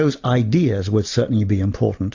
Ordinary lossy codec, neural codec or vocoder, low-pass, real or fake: AAC, 48 kbps; none; 7.2 kHz; real